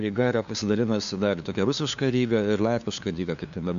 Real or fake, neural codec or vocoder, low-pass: fake; codec, 16 kHz, 2 kbps, FunCodec, trained on LibriTTS, 25 frames a second; 7.2 kHz